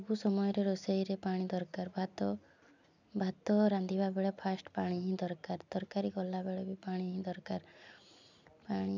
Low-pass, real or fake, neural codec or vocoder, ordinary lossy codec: 7.2 kHz; real; none; none